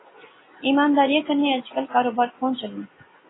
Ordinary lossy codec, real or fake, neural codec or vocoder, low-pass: AAC, 16 kbps; real; none; 7.2 kHz